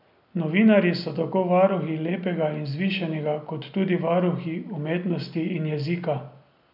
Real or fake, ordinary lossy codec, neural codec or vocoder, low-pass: real; none; none; 5.4 kHz